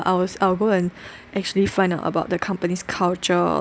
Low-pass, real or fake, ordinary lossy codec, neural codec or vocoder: none; real; none; none